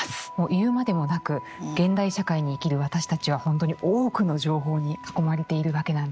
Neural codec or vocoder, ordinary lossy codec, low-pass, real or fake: none; none; none; real